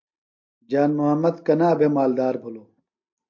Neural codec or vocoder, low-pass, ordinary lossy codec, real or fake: none; 7.2 kHz; MP3, 48 kbps; real